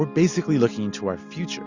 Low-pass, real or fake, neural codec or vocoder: 7.2 kHz; real; none